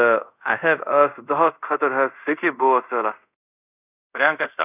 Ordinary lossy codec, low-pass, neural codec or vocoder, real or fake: none; 3.6 kHz; codec, 24 kHz, 0.5 kbps, DualCodec; fake